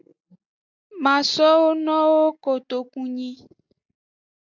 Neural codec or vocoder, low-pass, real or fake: none; 7.2 kHz; real